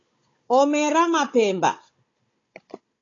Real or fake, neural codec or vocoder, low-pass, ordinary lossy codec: fake; codec, 16 kHz, 16 kbps, FunCodec, trained on Chinese and English, 50 frames a second; 7.2 kHz; AAC, 32 kbps